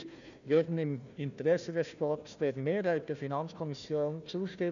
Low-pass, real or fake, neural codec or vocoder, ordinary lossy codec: 7.2 kHz; fake; codec, 16 kHz, 1 kbps, FunCodec, trained on Chinese and English, 50 frames a second; none